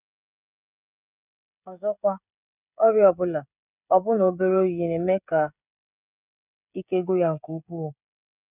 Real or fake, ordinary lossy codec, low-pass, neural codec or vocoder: fake; none; 3.6 kHz; codec, 16 kHz, 16 kbps, FreqCodec, smaller model